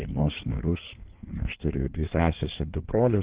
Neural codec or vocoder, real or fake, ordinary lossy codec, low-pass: codec, 16 kHz in and 24 kHz out, 1.1 kbps, FireRedTTS-2 codec; fake; Opus, 16 kbps; 3.6 kHz